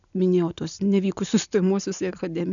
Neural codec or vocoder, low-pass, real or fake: none; 7.2 kHz; real